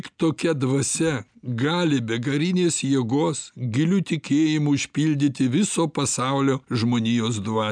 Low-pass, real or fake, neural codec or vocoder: 9.9 kHz; real; none